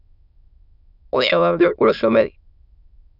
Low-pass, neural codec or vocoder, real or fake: 5.4 kHz; autoencoder, 22.05 kHz, a latent of 192 numbers a frame, VITS, trained on many speakers; fake